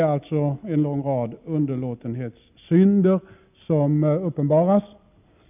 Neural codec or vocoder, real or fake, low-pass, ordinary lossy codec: none; real; 3.6 kHz; none